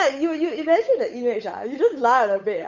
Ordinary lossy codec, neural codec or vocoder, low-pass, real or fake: none; codec, 16 kHz, 8 kbps, FunCodec, trained on Chinese and English, 25 frames a second; 7.2 kHz; fake